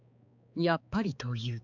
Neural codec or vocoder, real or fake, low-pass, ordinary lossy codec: codec, 16 kHz, 4 kbps, X-Codec, HuBERT features, trained on balanced general audio; fake; 7.2 kHz; none